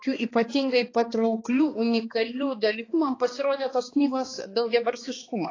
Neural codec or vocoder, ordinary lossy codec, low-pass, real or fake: codec, 16 kHz, 2 kbps, X-Codec, HuBERT features, trained on balanced general audio; AAC, 32 kbps; 7.2 kHz; fake